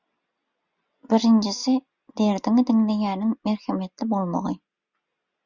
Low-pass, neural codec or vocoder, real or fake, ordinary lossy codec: 7.2 kHz; none; real; Opus, 64 kbps